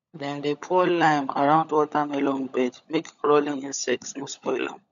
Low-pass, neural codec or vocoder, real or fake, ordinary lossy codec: 7.2 kHz; codec, 16 kHz, 16 kbps, FunCodec, trained on LibriTTS, 50 frames a second; fake; none